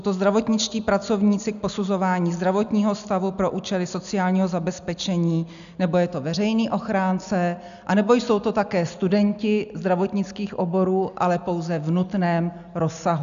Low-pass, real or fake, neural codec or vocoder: 7.2 kHz; real; none